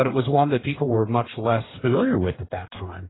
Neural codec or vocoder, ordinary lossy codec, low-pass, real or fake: codec, 44.1 kHz, 2.6 kbps, DAC; AAC, 16 kbps; 7.2 kHz; fake